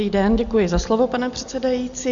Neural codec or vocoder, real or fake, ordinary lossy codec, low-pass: none; real; MP3, 64 kbps; 7.2 kHz